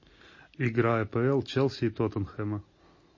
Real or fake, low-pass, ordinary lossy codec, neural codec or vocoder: real; 7.2 kHz; MP3, 32 kbps; none